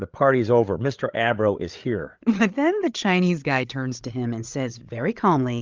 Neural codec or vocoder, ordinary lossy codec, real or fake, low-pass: codec, 16 kHz, 8 kbps, FreqCodec, larger model; Opus, 24 kbps; fake; 7.2 kHz